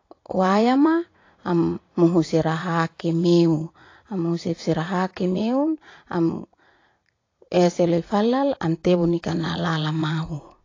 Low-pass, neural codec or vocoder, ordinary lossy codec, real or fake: 7.2 kHz; vocoder, 44.1 kHz, 80 mel bands, Vocos; AAC, 32 kbps; fake